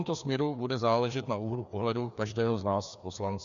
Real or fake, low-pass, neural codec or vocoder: fake; 7.2 kHz; codec, 16 kHz, 2 kbps, FreqCodec, larger model